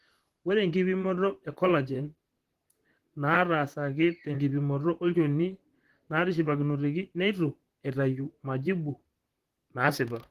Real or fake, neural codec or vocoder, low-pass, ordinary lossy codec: fake; vocoder, 44.1 kHz, 128 mel bands, Pupu-Vocoder; 14.4 kHz; Opus, 16 kbps